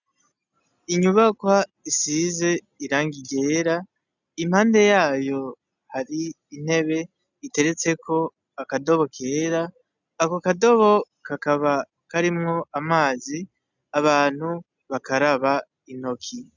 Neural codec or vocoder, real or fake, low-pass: none; real; 7.2 kHz